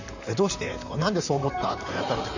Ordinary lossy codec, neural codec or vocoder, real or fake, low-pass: none; vocoder, 44.1 kHz, 128 mel bands, Pupu-Vocoder; fake; 7.2 kHz